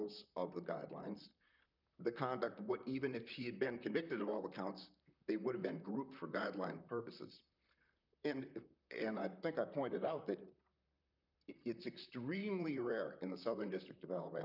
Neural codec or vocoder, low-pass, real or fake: vocoder, 44.1 kHz, 128 mel bands, Pupu-Vocoder; 5.4 kHz; fake